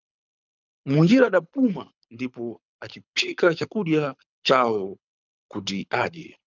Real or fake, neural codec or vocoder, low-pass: fake; codec, 24 kHz, 6 kbps, HILCodec; 7.2 kHz